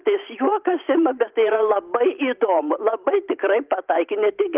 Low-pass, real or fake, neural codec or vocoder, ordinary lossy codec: 3.6 kHz; fake; vocoder, 44.1 kHz, 128 mel bands every 512 samples, BigVGAN v2; Opus, 24 kbps